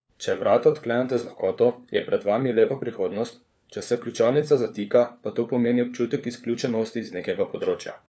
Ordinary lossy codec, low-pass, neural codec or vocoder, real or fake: none; none; codec, 16 kHz, 4 kbps, FunCodec, trained on LibriTTS, 50 frames a second; fake